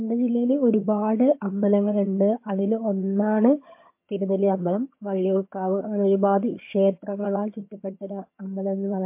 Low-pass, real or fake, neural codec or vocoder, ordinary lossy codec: 3.6 kHz; fake; vocoder, 22.05 kHz, 80 mel bands, HiFi-GAN; MP3, 24 kbps